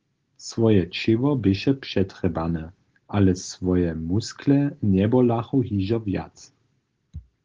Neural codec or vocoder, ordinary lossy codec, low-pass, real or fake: none; Opus, 16 kbps; 7.2 kHz; real